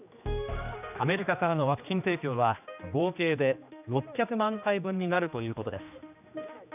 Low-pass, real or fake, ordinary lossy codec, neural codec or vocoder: 3.6 kHz; fake; none; codec, 16 kHz, 2 kbps, X-Codec, HuBERT features, trained on general audio